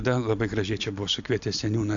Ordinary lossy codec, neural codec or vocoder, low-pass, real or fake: AAC, 64 kbps; none; 7.2 kHz; real